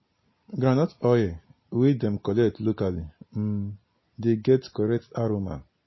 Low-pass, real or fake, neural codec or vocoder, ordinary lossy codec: 7.2 kHz; real; none; MP3, 24 kbps